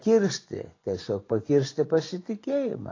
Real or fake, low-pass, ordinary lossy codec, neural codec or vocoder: fake; 7.2 kHz; AAC, 32 kbps; vocoder, 24 kHz, 100 mel bands, Vocos